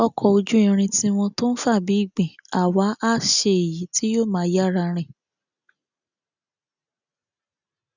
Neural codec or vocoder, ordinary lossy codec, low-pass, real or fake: none; none; 7.2 kHz; real